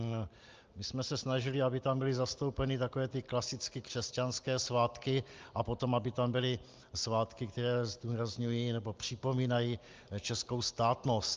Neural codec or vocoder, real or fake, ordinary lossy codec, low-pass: none; real; Opus, 24 kbps; 7.2 kHz